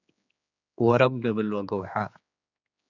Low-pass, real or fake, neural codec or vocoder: 7.2 kHz; fake; codec, 16 kHz, 4 kbps, X-Codec, HuBERT features, trained on general audio